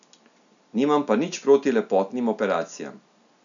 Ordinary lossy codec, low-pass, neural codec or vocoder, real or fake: MP3, 96 kbps; 7.2 kHz; none; real